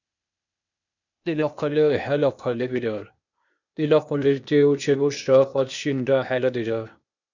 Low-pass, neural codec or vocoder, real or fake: 7.2 kHz; codec, 16 kHz, 0.8 kbps, ZipCodec; fake